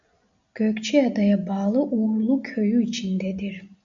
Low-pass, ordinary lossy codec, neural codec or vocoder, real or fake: 7.2 kHz; AAC, 64 kbps; none; real